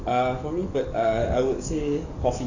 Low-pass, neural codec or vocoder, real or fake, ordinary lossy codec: 7.2 kHz; codec, 44.1 kHz, 7.8 kbps, DAC; fake; Opus, 64 kbps